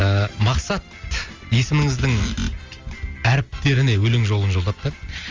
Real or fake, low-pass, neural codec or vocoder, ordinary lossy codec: real; 7.2 kHz; none; Opus, 32 kbps